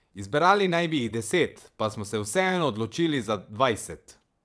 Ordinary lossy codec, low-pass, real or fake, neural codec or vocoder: none; none; fake; vocoder, 22.05 kHz, 80 mel bands, WaveNeXt